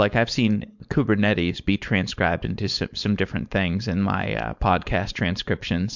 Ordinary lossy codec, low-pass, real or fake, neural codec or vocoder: MP3, 64 kbps; 7.2 kHz; fake; codec, 16 kHz, 4.8 kbps, FACodec